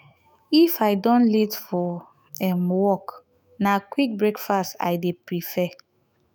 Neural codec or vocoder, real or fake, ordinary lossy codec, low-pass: autoencoder, 48 kHz, 128 numbers a frame, DAC-VAE, trained on Japanese speech; fake; none; none